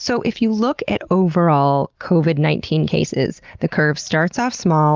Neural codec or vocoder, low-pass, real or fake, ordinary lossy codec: none; 7.2 kHz; real; Opus, 32 kbps